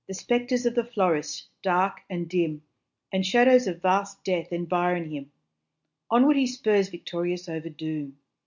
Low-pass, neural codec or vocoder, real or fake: 7.2 kHz; none; real